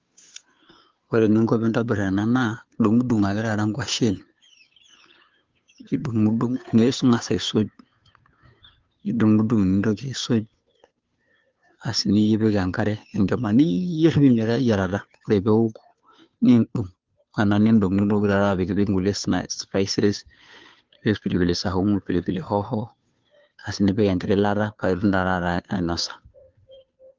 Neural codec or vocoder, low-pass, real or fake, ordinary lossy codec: codec, 16 kHz, 2 kbps, FunCodec, trained on Chinese and English, 25 frames a second; 7.2 kHz; fake; Opus, 32 kbps